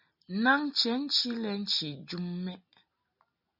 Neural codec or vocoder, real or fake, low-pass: none; real; 5.4 kHz